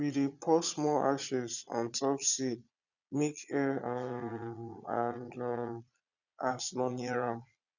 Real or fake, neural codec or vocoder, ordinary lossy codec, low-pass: fake; vocoder, 22.05 kHz, 80 mel bands, Vocos; none; 7.2 kHz